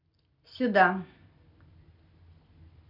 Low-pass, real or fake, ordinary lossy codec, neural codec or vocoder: 5.4 kHz; real; none; none